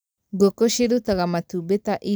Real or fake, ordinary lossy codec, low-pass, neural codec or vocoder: real; none; none; none